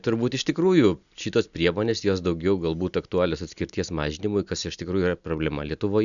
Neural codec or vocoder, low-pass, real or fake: none; 7.2 kHz; real